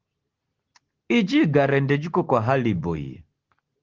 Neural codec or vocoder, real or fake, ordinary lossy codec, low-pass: none; real; Opus, 16 kbps; 7.2 kHz